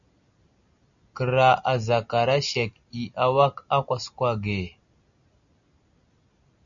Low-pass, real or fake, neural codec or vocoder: 7.2 kHz; real; none